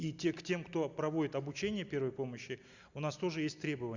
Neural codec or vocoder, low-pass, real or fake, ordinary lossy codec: none; 7.2 kHz; real; Opus, 64 kbps